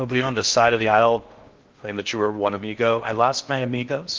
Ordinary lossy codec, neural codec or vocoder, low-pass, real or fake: Opus, 24 kbps; codec, 16 kHz in and 24 kHz out, 0.6 kbps, FocalCodec, streaming, 4096 codes; 7.2 kHz; fake